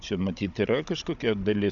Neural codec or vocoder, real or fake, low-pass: codec, 16 kHz, 16 kbps, FunCodec, trained on Chinese and English, 50 frames a second; fake; 7.2 kHz